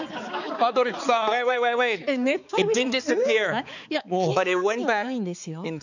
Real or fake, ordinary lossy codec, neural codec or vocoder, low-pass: fake; none; codec, 16 kHz, 4 kbps, X-Codec, HuBERT features, trained on balanced general audio; 7.2 kHz